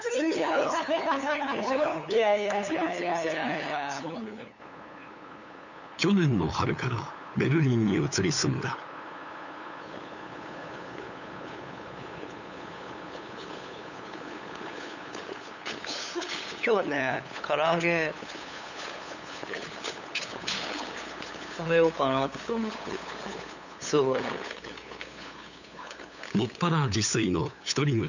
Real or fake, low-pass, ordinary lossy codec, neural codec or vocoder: fake; 7.2 kHz; none; codec, 16 kHz, 8 kbps, FunCodec, trained on LibriTTS, 25 frames a second